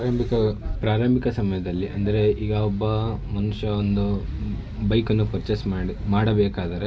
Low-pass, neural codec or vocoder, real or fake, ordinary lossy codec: none; none; real; none